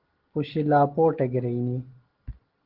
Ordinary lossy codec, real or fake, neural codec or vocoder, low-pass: Opus, 16 kbps; real; none; 5.4 kHz